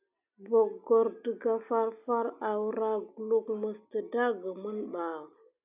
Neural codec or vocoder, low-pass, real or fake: none; 3.6 kHz; real